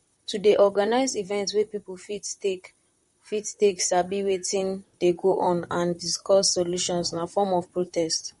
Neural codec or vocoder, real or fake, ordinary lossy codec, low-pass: vocoder, 44.1 kHz, 128 mel bands, Pupu-Vocoder; fake; MP3, 48 kbps; 19.8 kHz